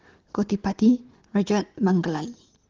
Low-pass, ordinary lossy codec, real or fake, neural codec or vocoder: 7.2 kHz; Opus, 16 kbps; real; none